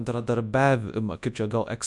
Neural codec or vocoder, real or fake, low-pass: codec, 24 kHz, 0.9 kbps, WavTokenizer, large speech release; fake; 10.8 kHz